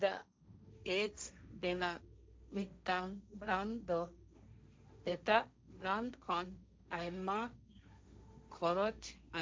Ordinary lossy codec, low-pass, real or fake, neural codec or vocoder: none; none; fake; codec, 16 kHz, 1.1 kbps, Voila-Tokenizer